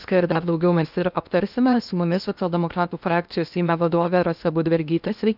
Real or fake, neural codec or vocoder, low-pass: fake; codec, 16 kHz in and 24 kHz out, 0.8 kbps, FocalCodec, streaming, 65536 codes; 5.4 kHz